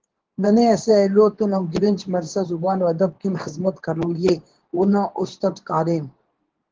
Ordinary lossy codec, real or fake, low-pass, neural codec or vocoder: Opus, 16 kbps; fake; 7.2 kHz; codec, 24 kHz, 0.9 kbps, WavTokenizer, medium speech release version 2